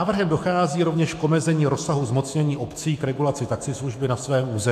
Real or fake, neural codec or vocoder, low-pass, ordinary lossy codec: fake; autoencoder, 48 kHz, 128 numbers a frame, DAC-VAE, trained on Japanese speech; 14.4 kHz; AAC, 96 kbps